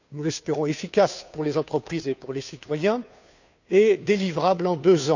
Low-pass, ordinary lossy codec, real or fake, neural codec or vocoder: 7.2 kHz; none; fake; codec, 16 kHz, 2 kbps, FunCodec, trained on Chinese and English, 25 frames a second